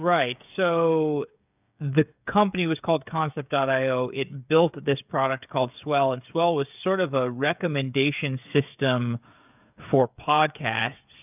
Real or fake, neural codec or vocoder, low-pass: fake; codec, 16 kHz, 8 kbps, FreqCodec, smaller model; 3.6 kHz